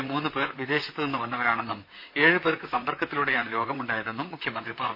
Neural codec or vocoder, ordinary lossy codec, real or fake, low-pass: vocoder, 44.1 kHz, 128 mel bands, Pupu-Vocoder; MP3, 32 kbps; fake; 5.4 kHz